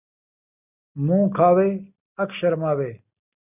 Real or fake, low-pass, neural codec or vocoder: real; 3.6 kHz; none